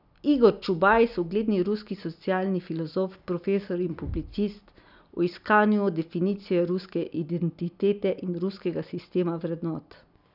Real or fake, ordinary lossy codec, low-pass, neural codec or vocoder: real; none; 5.4 kHz; none